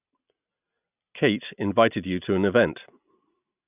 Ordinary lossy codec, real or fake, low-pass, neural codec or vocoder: none; real; 3.6 kHz; none